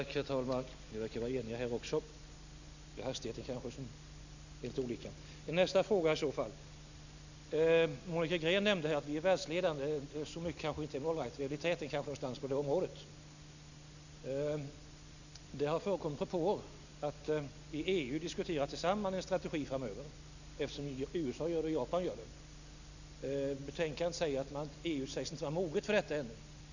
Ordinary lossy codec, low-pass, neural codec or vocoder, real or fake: none; 7.2 kHz; none; real